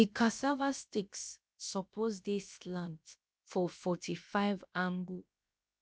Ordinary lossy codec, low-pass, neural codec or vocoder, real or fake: none; none; codec, 16 kHz, about 1 kbps, DyCAST, with the encoder's durations; fake